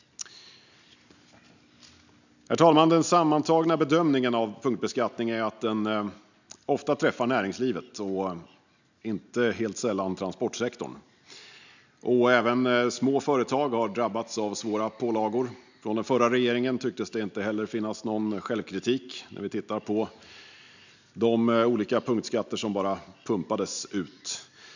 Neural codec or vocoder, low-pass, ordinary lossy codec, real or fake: none; 7.2 kHz; none; real